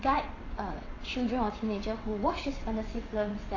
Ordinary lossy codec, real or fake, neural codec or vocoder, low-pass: AAC, 32 kbps; fake; vocoder, 22.05 kHz, 80 mel bands, WaveNeXt; 7.2 kHz